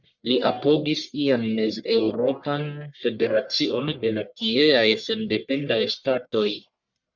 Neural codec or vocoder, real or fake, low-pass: codec, 44.1 kHz, 1.7 kbps, Pupu-Codec; fake; 7.2 kHz